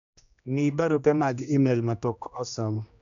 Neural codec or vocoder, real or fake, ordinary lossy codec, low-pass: codec, 16 kHz, 1 kbps, X-Codec, HuBERT features, trained on general audio; fake; none; 7.2 kHz